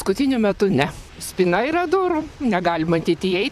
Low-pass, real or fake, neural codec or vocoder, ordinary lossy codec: 14.4 kHz; fake; vocoder, 44.1 kHz, 128 mel bands, Pupu-Vocoder; AAC, 96 kbps